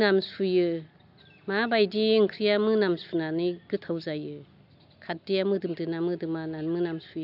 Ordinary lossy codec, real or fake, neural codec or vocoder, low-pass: AAC, 48 kbps; real; none; 5.4 kHz